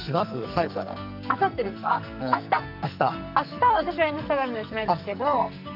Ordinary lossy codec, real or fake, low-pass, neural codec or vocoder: none; fake; 5.4 kHz; codec, 44.1 kHz, 2.6 kbps, SNAC